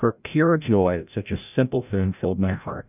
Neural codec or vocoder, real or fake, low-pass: codec, 16 kHz, 0.5 kbps, FreqCodec, larger model; fake; 3.6 kHz